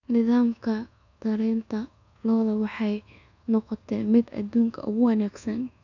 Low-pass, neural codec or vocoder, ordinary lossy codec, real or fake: 7.2 kHz; codec, 24 kHz, 1.2 kbps, DualCodec; none; fake